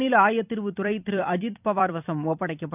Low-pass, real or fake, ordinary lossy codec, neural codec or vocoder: 3.6 kHz; fake; none; vocoder, 44.1 kHz, 128 mel bands every 256 samples, BigVGAN v2